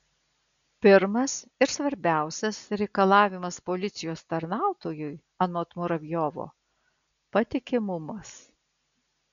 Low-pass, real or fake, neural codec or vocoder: 7.2 kHz; real; none